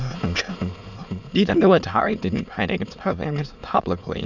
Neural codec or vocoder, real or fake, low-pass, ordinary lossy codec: autoencoder, 22.05 kHz, a latent of 192 numbers a frame, VITS, trained on many speakers; fake; 7.2 kHz; MP3, 64 kbps